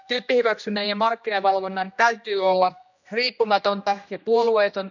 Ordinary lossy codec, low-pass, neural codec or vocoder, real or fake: none; 7.2 kHz; codec, 16 kHz, 1 kbps, X-Codec, HuBERT features, trained on general audio; fake